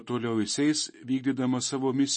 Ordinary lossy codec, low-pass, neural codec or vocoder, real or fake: MP3, 32 kbps; 10.8 kHz; none; real